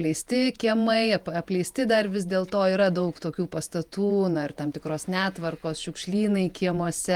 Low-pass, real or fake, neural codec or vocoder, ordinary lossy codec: 19.8 kHz; fake; vocoder, 48 kHz, 128 mel bands, Vocos; Opus, 32 kbps